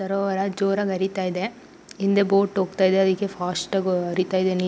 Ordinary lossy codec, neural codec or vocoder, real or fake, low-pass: none; none; real; none